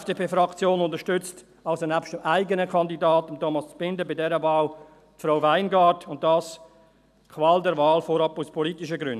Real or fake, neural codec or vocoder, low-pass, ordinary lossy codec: real; none; 14.4 kHz; none